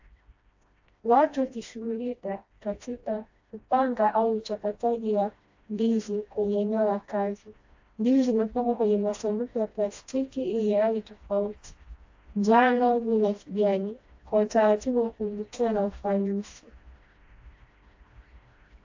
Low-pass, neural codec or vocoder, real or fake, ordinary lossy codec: 7.2 kHz; codec, 16 kHz, 1 kbps, FreqCodec, smaller model; fake; AAC, 48 kbps